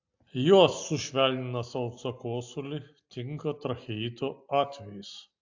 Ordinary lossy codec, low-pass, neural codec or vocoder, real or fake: AAC, 48 kbps; 7.2 kHz; none; real